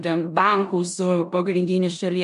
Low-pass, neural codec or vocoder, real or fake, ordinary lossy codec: 10.8 kHz; codec, 16 kHz in and 24 kHz out, 0.9 kbps, LongCat-Audio-Codec, four codebook decoder; fake; MP3, 64 kbps